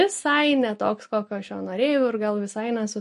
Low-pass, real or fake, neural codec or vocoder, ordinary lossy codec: 14.4 kHz; real; none; MP3, 48 kbps